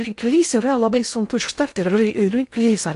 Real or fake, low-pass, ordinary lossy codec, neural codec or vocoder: fake; 10.8 kHz; AAC, 64 kbps; codec, 16 kHz in and 24 kHz out, 0.6 kbps, FocalCodec, streaming, 2048 codes